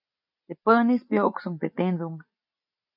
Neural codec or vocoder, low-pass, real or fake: none; 5.4 kHz; real